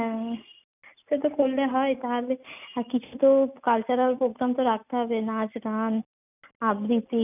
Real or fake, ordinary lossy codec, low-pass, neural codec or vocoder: real; none; 3.6 kHz; none